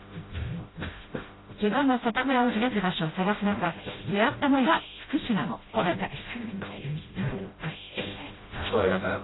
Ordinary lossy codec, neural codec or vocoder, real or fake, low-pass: AAC, 16 kbps; codec, 16 kHz, 0.5 kbps, FreqCodec, smaller model; fake; 7.2 kHz